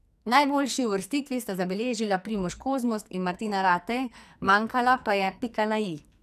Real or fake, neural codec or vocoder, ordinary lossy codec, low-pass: fake; codec, 44.1 kHz, 2.6 kbps, SNAC; none; 14.4 kHz